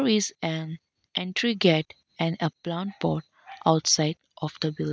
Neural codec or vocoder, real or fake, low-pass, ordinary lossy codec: none; real; none; none